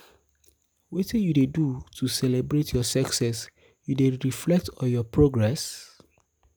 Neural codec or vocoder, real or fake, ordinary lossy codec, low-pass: none; real; none; none